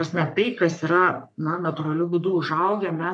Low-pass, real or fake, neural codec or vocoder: 10.8 kHz; fake; codec, 44.1 kHz, 3.4 kbps, Pupu-Codec